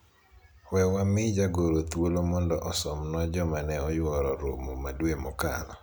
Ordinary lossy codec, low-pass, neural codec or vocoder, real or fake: none; none; none; real